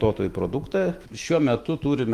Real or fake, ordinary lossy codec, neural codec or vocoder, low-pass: fake; Opus, 32 kbps; autoencoder, 48 kHz, 128 numbers a frame, DAC-VAE, trained on Japanese speech; 14.4 kHz